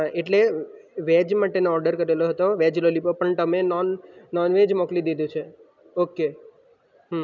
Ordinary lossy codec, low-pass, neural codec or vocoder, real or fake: none; 7.2 kHz; none; real